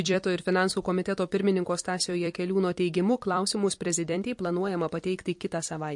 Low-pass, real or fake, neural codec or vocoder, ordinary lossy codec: 10.8 kHz; fake; vocoder, 44.1 kHz, 128 mel bands every 256 samples, BigVGAN v2; MP3, 48 kbps